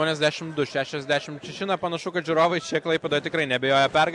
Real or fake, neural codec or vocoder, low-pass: real; none; 10.8 kHz